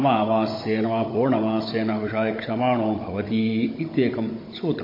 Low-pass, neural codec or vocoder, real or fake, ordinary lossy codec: 5.4 kHz; codec, 16 kHz, 16 kbps, FunCodec, trained on Chinese and English, 50 frames a second; fake; MP3, 24 kbps